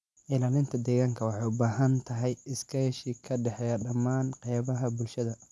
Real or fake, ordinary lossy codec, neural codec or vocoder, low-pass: real; none; none; none